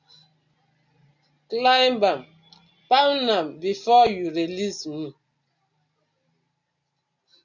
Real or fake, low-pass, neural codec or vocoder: real; 7.2 kHz; none